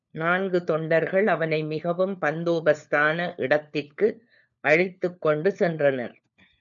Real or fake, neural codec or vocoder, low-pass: fake; codec, 16 kHz, 4 kbps, FunCodec, trained on LibriTTS, 50 frames a second; 7.2 kHz